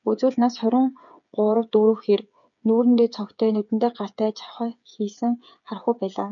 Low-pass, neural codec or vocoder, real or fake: 7.2 kHz; codec, 16 kHz, 8 kbps, FreqCodec, smaller model; fake